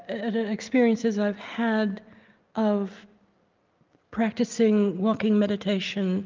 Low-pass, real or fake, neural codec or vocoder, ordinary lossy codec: 7.2 kHz; real; none; Opus, 24 kbps